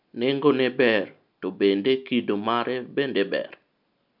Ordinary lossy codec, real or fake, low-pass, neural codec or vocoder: MP3, 48 kbps; real; 5.4 kHz; none